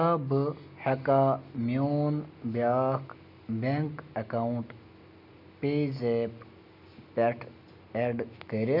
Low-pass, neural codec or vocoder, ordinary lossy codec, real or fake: 5.4 kHz; none; none; real